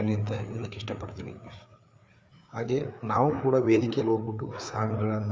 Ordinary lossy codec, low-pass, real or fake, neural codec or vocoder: none; none; fake; codec, 16 kHz, 4 kbps, FreqCodec, larger model